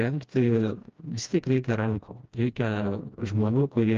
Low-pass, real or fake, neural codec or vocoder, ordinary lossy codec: 7.2 kHz; fake; codec, 16 kHz, 1 kbps, FreqCodec, smaller model; Opus, 24 kbps